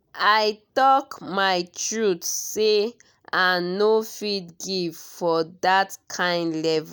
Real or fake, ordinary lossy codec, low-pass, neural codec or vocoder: real; none; none; none